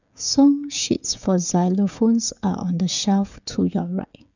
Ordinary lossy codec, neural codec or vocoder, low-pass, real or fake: none; codec, 16 kHz, 16 kbps, FreqCodec, smaller model; 7.2 kHz; fake